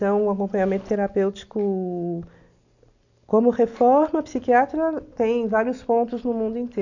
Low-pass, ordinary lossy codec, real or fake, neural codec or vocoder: 7.2 kHz; none; real; none